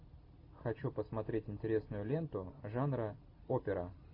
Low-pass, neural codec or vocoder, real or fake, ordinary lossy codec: 5.4 kHz; none; real; AAC, 48 kbps